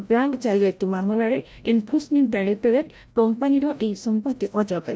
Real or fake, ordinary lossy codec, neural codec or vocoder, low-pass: fake; none; codec, 16 kHz, 0.5 kbps, FreqCodec, larger model; none